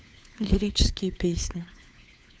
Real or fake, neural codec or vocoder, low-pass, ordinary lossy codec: fake; codec, 16 kHz, 4 kbps, FunCodec, trained on LibriTTS, 50 frames a second; none; none